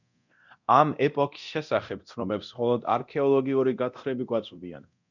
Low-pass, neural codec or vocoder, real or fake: 7.2 kHz; codec, 24 kHz, 0.9 kbps, DualCodec; fake